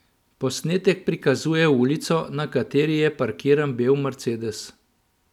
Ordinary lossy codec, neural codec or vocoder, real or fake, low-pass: none; none; real; 19.8 kHz